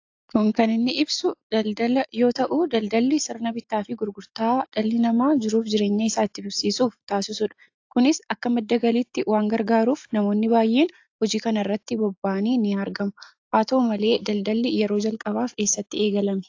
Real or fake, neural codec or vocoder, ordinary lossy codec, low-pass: real; none; AAC, 48 kbps; 7.2 kHz